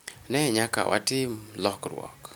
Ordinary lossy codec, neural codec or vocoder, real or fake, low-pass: none; none; real; none